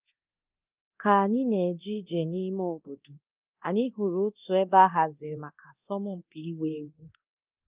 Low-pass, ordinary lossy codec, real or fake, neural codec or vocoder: 3.6 kHz; Opus, 24 kbps; fake; codec, 24 kHz, 0.9 kbps, DualCodec